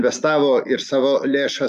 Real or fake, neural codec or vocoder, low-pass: real; none; 14.4 kHz